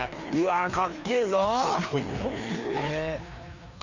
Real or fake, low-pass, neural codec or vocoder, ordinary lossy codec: fake; 7.2 kHz; codec, 16 kHz, 2 kbps, FreqCodec, larger model; none